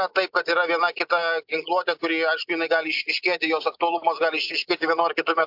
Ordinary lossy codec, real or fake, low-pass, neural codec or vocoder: MP3, 48 kbps; real; 5.4 kHz; none